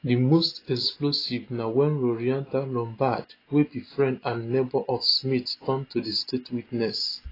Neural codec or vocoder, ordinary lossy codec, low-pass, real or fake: none; AAC, 24 kbps; 5.4 kHz; real